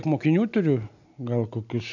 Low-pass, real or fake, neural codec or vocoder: 7.2 kHz; real; none